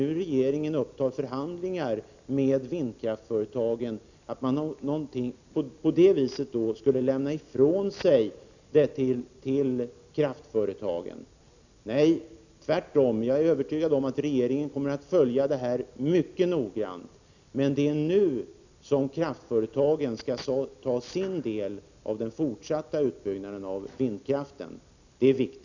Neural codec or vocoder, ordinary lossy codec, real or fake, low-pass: none; none; real; 7.2 kHz